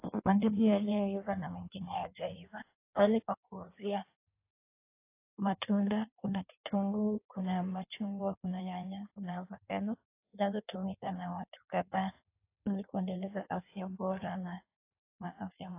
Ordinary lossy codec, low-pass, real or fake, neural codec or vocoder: AAC, 24 kbps; 3.6 kHz; fake; codec, 16 kHz in and 24 kHz out, 1.1 kbps, FireRedTTS-2 codec